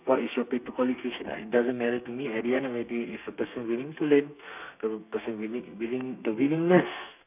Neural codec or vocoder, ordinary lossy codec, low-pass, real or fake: codec, 32 kHz, 1.9 kbps, SNAC; none; 3.6 kHz; fake